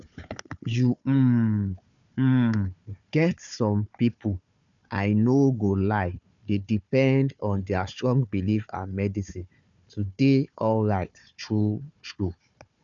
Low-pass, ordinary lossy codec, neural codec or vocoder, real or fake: 7.2 kHz; none; codec, 16 kHz, 4 kbps, FunCodec, trained on Chinese and English, 50 frames a second; fake